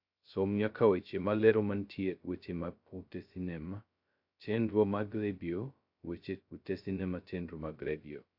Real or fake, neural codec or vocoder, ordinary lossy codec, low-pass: fake; codec, 16 kHz, 0.2 kbps, FocalCodec; AAC, 48 kbps; 5.4 kHz